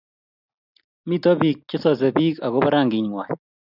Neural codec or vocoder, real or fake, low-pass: none; real; 5.4 kHz